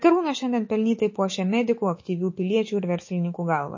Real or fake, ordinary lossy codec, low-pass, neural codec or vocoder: fake; MP3, 32 kbps; 7.2 kHz; autoencoder, 48 kHz, 128 numbers a frame, DAC-VAE, trained on Japanese speech